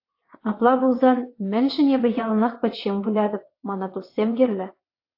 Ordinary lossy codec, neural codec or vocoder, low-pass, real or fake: AAC, 32 kbps; vocoder, 22.05 kHz, 80 mel bands, WaveNeXt; 5.4 kHz; fake